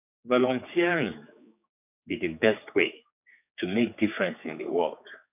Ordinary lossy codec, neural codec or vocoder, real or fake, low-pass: none; codec, 16 kHz, 4 kbps, X-Codec, HuBERT features, trained on general audio; fake; 3.6 kHz